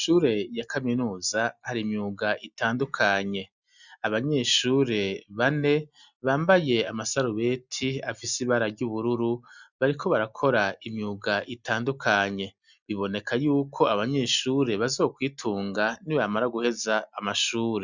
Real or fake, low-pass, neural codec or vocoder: real; 7.2 kHz; none